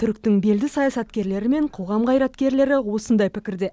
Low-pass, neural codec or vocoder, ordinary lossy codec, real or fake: none; none; none; real